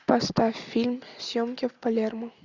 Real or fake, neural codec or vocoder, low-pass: real; none; 7.2 kHz